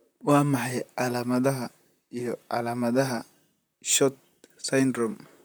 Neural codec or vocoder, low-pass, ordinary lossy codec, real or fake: vocoder, 44.1 kHz, 128 mel bands, Pupu-Vocoder; none; none; fake